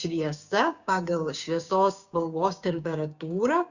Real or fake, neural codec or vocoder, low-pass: fake; codec, 16 kHz, 6 kbps, DAC; 7.2 kHz